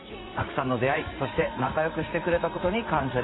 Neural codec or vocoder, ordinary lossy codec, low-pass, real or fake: vocoder, 44.1 kHz, 80 mel bands, Vocos; AAC, 16 kbps; 7.2 kHz; fake